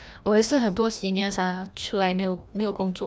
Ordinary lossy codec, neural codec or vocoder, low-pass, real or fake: none; codec, 16 kHz, 1 kbps, FreqCodec, larger model; none; fake